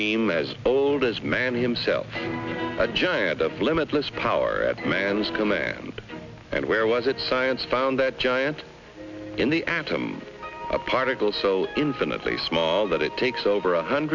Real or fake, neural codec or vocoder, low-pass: real; none; 7.2 kHz